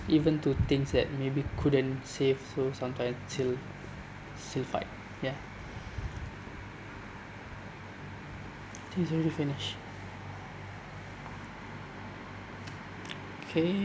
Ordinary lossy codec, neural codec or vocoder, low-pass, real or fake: none; none; none; real